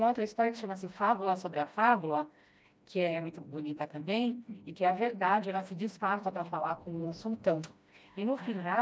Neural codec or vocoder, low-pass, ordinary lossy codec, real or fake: codec, 16 kHz, 1 kbps, FreqCodec, smaller model; none; none; fake